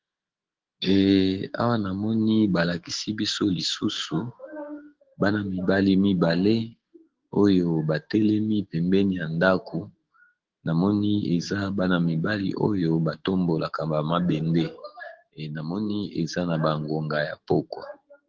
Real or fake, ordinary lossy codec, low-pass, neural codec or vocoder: fake; Opus, 16 kbps; 7.2 kHz; autoencoder, 48 kHz, 128 numbers a frame, DAC-VAE, trained on Japanese speech